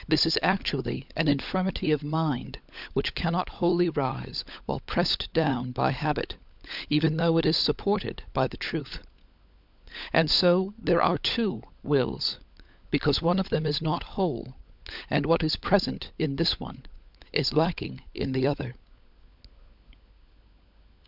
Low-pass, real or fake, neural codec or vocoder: 5.4 kHz; fake; codec, 16 kHz, 16 kbps, FunCodec, trained on LibriTTS, 50 frames a second